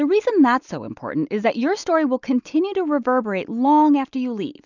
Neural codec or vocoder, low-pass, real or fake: none; 7.2 kHz; real